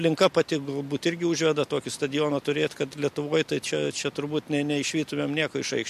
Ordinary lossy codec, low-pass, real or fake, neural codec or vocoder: MP3, 64 kbps; 14.4 kHz; real; none